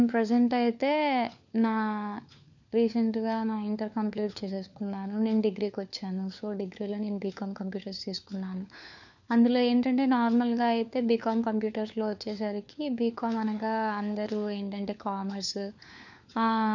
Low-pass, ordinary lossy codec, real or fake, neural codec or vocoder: 7.2 kHz; none; fake; codec, 16 kHz, 4 kbps, FunCodec, trained on LibriTTS, 50 frames a second